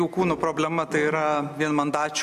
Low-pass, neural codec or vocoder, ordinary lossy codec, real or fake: 14.4 kHz; none; AAC, 96 kbps; real